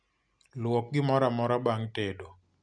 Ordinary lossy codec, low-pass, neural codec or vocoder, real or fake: none; 9.9 kHz; none; real